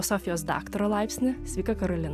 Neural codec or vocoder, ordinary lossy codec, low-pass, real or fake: none; AAC, 96 kbps; 14.4 kHz; real